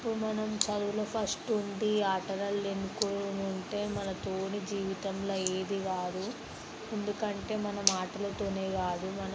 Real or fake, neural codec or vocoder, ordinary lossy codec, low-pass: real; none; none; none